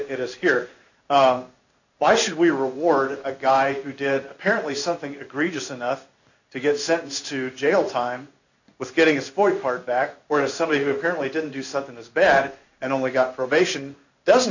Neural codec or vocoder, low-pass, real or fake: codec, 16 kHz in and 24 kHz out, 1 kbps, XY-Tokenizer; 7.2 kHz; fake